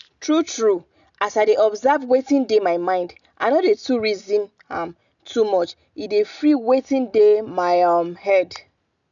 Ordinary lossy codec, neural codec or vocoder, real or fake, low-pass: none; none; real; 7.2 kHz